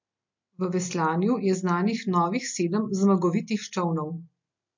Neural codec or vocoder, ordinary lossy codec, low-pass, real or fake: none; MP3, 48 kbps; 7.2 kHz; real